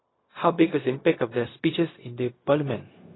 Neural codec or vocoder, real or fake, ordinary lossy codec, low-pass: codec, 16 kHz, 0.4 kbps, LongCat-Audio-Codec; fake; AAC, 16 kbps; 7.2 kHz